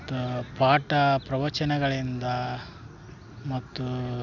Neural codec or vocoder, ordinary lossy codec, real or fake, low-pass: none; none; real; 7.2 kHz